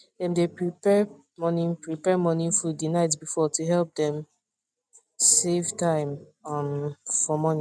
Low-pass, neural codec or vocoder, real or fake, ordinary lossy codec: none; none; real; none